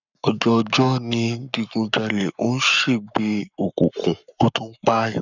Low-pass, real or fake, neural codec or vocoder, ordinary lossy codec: 7.2 kHz; fake; codec, 44.1 kHz, 7.8 kbps, Pupu-Codec; none